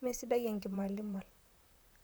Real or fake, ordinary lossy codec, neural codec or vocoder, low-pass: fake; none; vocoder, 44.1 kHz, 128 mel bands, Pupu-Vocoder; none